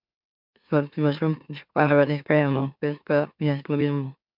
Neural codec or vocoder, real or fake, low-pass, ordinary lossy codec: autoencoder, 44.1 kHz, a latent of 192 numbers a frame, MeloTTS; fake; 5.4 kHz; MP3, 48 kbps